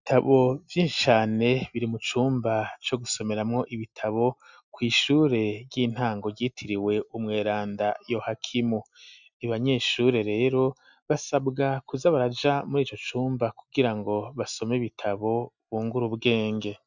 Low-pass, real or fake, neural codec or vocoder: 7.2 kHz; real; none